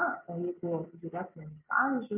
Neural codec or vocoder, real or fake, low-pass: none; real; 3.6 kHz